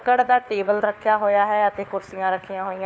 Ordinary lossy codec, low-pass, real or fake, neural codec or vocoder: none; none; fake; codec, 16 kHz, 4 kbps, FunCodec, trained on LibriTTS, 50 frames a second